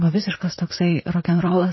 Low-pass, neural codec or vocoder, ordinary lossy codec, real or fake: 7.2 kHz; vocoder, 44.1 kHz, 128 mel bands, Pupu-Vocoder; MP3, 24 kbps; fake